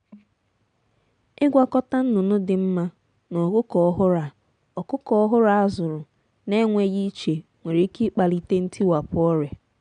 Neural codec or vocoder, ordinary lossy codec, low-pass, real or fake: none; none; 9.9 kHz; real